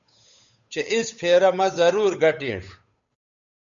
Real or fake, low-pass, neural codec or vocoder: fake; 7.2 kHz; codec, 16 kHz, 8 kbps, FunCodec, trained on Chinese and English, 25 frames a second